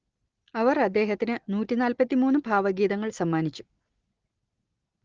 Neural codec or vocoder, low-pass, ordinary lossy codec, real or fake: none; 7.2 kHz; Opus, 16 kbps; real